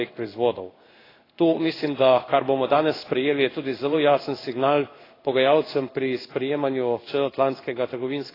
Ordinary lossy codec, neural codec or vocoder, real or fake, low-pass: AAC, 24 kbps; codec, 16 kHz in and 24 kHz out, 1 kbps, XY-Tokenizer; fake; 5.4 kHz